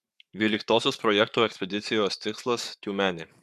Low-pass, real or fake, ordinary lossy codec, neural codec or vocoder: 14.4 kHz; fake; MP3, 96 kbps; codec, 44.1 kHz, 7.8 kbps, Pupu-Codec